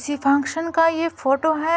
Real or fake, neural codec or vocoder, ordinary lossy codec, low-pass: real; none; none; none